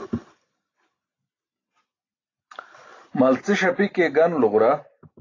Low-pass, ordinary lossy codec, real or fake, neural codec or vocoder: 7.2 kHz; AAC, 32 kbps; real; none